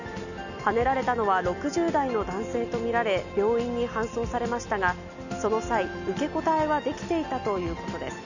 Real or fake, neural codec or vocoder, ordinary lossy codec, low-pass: real; none; none; 7.2 kHz